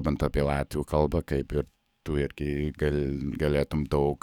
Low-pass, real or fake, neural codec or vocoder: 19.8 kHz; fake; codec, 44.1 kHz, 7.8 kbps, DAC